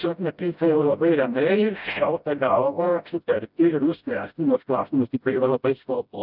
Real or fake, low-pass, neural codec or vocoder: fake; 5.4 kHz; codec, 16 kHz, 0.5 kbps, FreqCodec, smaller model